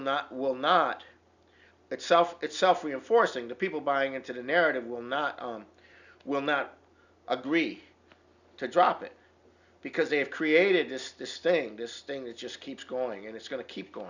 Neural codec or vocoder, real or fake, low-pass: none; real; 7.2 kHz